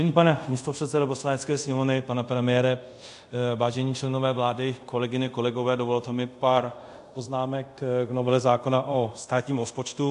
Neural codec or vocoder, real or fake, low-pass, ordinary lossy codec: codec, 24 kHz, 0.5 kbps, DualCodec; fake; 10.8 kHz; AAC, 64 kbps